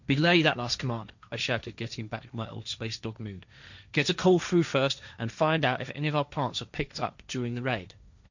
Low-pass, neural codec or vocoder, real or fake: 7.2 kHz; codec, 16 kHz, 1.1 kbps, Voila-Tokenizer; fake